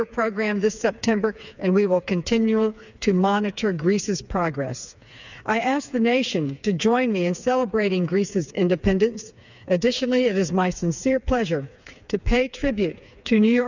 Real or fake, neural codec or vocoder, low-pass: fake; codec, 16 kHz, 4 kbps, FreqCodec, smaller model; 7.2 kHz